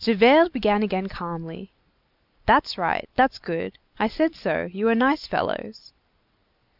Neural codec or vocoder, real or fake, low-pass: none; real; 5.4 kHz